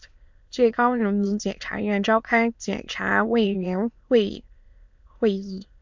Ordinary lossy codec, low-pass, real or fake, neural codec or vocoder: MP3, 64 kbps; 7.2 kHz; fake; autoencoder, 22.05 kHz, a latent of 192 numbers a frame, VITS, trained on many speakers